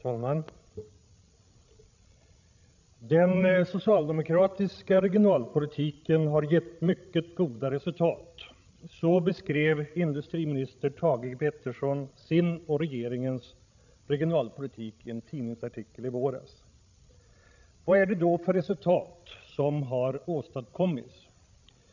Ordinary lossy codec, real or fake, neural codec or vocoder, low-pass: none; fake; codec, 16 kHz, 16 kbps, FreqCodec, larger model; 7.2 kHz